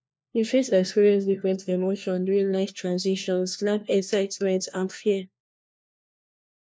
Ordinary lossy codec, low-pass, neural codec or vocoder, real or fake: none; none; codec, 16 kHz, 1 kbps, FunCodec, trained on LibriTTS, 50 frames a second; fake